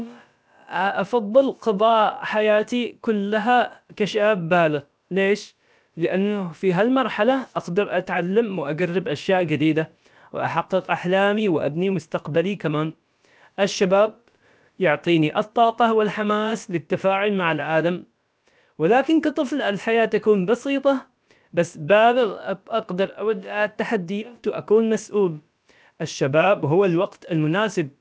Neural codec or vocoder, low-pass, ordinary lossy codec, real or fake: codec, 16 kHz, about 1 kbps, DyCAST, with the encoder's durations; none; none; fake